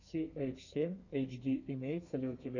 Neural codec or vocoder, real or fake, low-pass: codec, 32 kHz, 1.9 kbps, SNAC; fake; 7.2 kHz